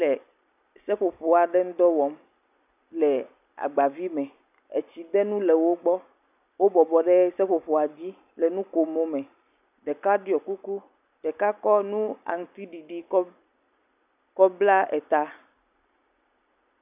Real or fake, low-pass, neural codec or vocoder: real; 3.6 kHz; none